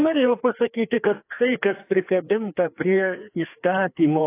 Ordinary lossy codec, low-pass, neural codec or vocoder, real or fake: AAC, 24 kbps; 3.6 kHz; codec, 16 kHz, 2 kbps, FreqCodec, larger model; fake